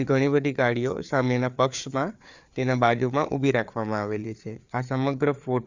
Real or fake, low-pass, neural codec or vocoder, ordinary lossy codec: fake; 7.2 kHz; codec, 16 kHz, 4 kbps, FreqCodec, larger model; Opus, 64 kbps